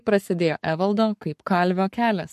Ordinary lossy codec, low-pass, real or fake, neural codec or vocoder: MP3, 64 kbps; 14.4 kHz; fake; codec, 44.1 kHz, 7.8 kbps, Pupu-Codec